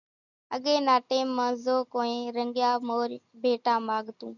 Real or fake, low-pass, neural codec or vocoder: real; 7.2 kHz; none